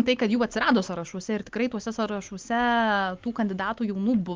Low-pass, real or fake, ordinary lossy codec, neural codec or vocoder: 7.2 kHz; real; Opus, 32 kbps; none